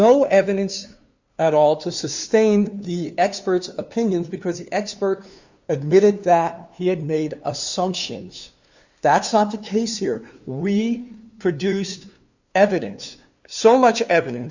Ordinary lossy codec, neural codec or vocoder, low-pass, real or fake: Opus, 64 kbps; codec, 16 kHz, 2 kbps, FunCodec, trained on LibriTTS, 25 frames a second; 7.2 kHz; fake